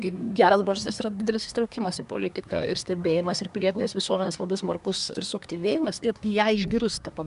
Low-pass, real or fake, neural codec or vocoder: 10.8 kHz; fake; codec, 24 kHz, 1 kbps, SNAC